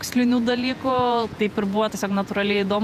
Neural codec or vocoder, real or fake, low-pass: vocoder, 48 kHz, 128 mel bands, Vocos; fake; 14.4 kHz